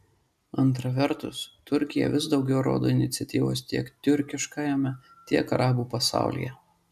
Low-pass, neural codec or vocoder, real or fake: 14.4 kHz; none; real